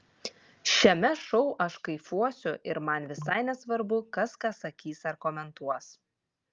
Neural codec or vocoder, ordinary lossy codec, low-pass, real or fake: none; Opus, 32 kbps; 7.2 kHz; real